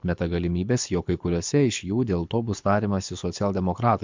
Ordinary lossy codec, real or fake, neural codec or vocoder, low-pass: MP3, 64 kbps; fake; codec, 24 kHz, 6 kbps, HILCodec; 7.2 kHz